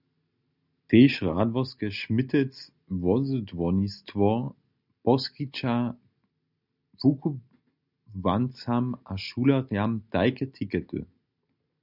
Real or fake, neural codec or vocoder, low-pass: real; none; 5.4 kHz